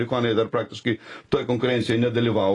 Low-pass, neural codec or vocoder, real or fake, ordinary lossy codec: 10.8 kHz; none; real; AAC, 32 kbps